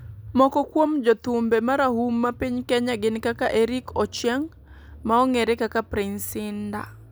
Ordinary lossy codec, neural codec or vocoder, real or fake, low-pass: none; none; real; none